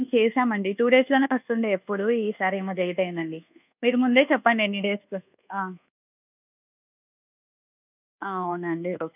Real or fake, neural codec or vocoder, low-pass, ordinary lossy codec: fake; codec, 24 kHz, 1.2 kbps, DualCodec; 3.6 kHz; none